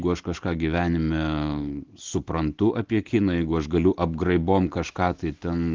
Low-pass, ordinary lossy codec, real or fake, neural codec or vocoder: 7.2 kHz; Opus, 16 kbps; real; none